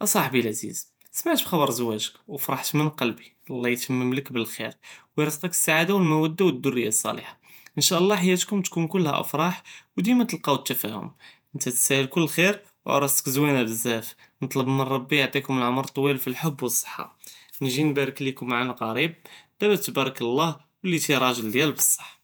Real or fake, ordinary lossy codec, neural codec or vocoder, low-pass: fake; none; vocoder, 48 kHz, 128 mel bands, Vocos; none